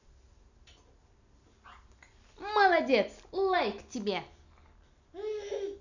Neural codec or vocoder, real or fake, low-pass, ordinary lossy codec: none; real; 7.2 kHz; none